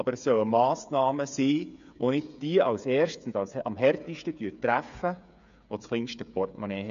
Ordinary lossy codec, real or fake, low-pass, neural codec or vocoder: AAC, 96 kbps; fake; 7.2 kHz; codec, 16 kHz, 8 kbps, FreqCodec, smaller model